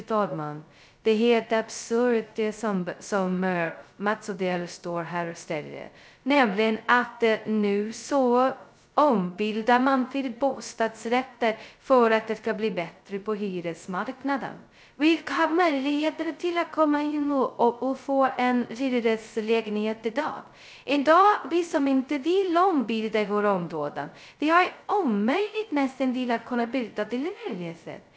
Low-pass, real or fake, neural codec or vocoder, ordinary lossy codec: none; fake; codec, 16 kHz, 0.2 kbps, FocalCodec; none